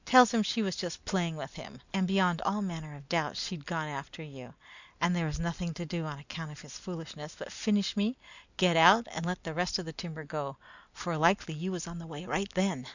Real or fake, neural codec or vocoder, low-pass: real; none; 7.2 kHz